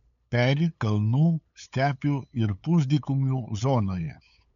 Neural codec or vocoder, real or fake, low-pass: codec, 16 kHz, 8 kbps, FunCodec, trained on LibriTTS, 25 frames a second; fake; 7.2 kHz